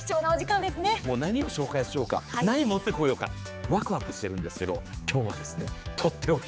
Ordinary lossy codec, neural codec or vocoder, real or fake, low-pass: none; codec, 16 kHz, 4 kbps, X-Codec, HuBERT features, trained on general audio; fake; none